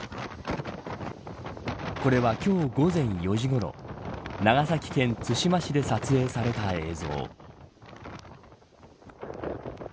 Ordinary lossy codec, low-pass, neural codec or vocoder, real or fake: none; none; none; real